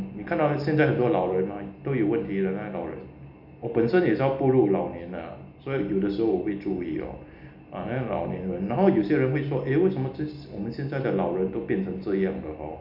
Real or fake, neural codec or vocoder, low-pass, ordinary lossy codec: real; none; 5.4 kHz; none